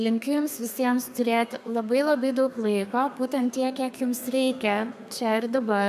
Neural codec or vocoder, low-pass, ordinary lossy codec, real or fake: codec, 32 kHz, 1.9 kbps, SNAC; 14.4 kHz; AAC, 96 kbps; fake